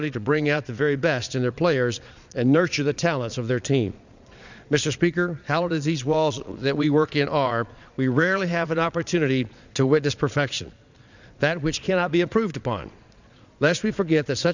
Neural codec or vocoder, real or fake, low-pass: vocoder, 22.05 kHz, 80 mel bands, Vocos; fake; 7.2 kHz